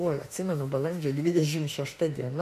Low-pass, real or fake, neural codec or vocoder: 14.4 kHz; fake; autoencoder, 48 kHz, 32 numbers a frame, DAC-VAE, trained on Japanese speech